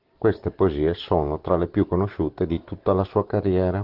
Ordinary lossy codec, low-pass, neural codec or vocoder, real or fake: Opus, 16 kbps; 5.4 kHz; none; real